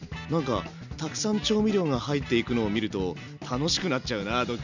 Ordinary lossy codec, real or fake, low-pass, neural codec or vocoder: none; real; 7.2 kHz; none